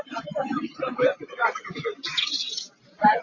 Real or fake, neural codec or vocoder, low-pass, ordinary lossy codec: real; none; 7.2 kHz; AAC, 32 kbps